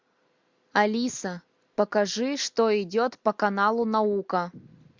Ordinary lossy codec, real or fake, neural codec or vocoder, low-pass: MP3, 64 kbps; real; none; 7.2 kHz